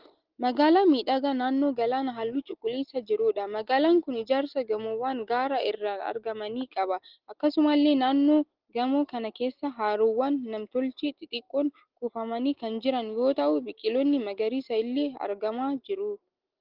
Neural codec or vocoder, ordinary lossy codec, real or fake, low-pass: none; Opus, 16 kbps; real; 5.4 kHz